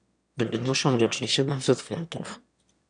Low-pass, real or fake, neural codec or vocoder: 9.9 kHz; fake; autoencoder, 22.05 kHz, a latent of 192 numbers a frame, VITS, trained on one speaker